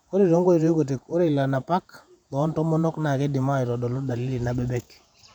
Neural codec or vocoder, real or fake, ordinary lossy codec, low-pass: vocoder, 48 kHz, 128 mel bands, Vocos; fake; none; 19.8 kHz